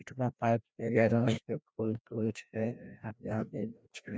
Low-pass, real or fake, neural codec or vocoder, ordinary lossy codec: none; fake; codec, 16 kHz, 1 kbps, FreqCodec, larger model; none